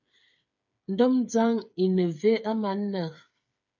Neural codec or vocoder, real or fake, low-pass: codec, 16 kHz, 8 kbps, FreqCodec, smaller model; fake; 7.2 kHz